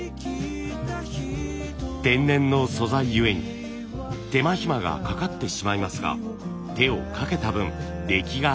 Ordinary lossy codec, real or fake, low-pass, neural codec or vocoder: none; real; none; none